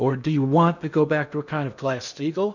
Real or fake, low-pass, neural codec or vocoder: fake; 7.2 kHz; codec, 16 kHz in and 24 kHz out, 0.8 kbps, FocalCodec, streaming, 65536 codes